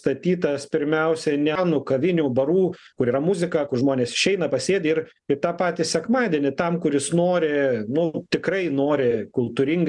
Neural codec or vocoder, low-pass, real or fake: none; 10.8 kHz; real